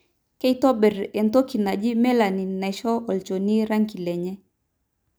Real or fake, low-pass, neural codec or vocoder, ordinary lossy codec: real; none; none; none